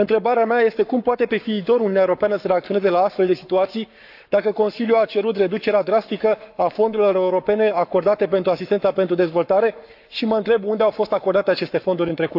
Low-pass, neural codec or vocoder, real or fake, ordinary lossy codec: 5.4 kHz; codec, 44.1 kHz, 7.8 kbps, Pupu-Codec; fake; AAC, 48 kbps